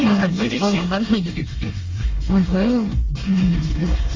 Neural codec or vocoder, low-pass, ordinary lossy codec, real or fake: codec, 24 kHz, 1 kbps, SNAC; 7.2 kHz; Opus, 32 kbps; fake